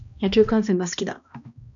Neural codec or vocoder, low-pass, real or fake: codec, 16 kHz, 1 kbps, X-Codec, HuBERT features, trained on balanced general audio; 7.2 kHz; fake